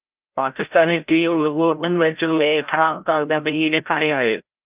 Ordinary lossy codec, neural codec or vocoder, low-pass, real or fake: Opus, 24 kbps; codec, 16 kHz, 0.5 kbps, FreqCodec, larger model; 3.6 kHz; fake